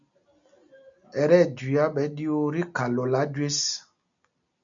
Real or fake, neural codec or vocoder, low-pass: real; none; 7.2 kHz